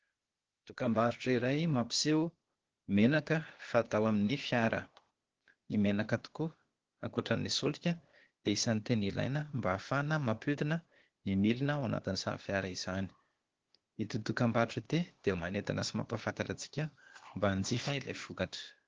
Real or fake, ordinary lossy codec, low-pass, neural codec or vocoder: fake; Opus, 16 kbps; 7.2 kHz; codec, 16 kHz, 0.8 kbps, ZipCodec